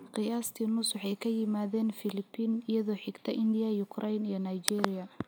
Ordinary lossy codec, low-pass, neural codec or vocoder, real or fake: none; none; none; real